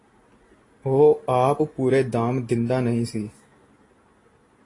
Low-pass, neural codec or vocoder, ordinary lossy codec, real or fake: 10.8 kHz; none; AAC, 32 kbps; real